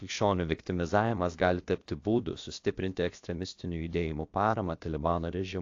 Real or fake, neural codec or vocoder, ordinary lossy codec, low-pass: fake; codec, 16 kHz, about 1 kbps, DyCAST, with the encoder's durations; AAC, 48 kbps; 7.2 kHz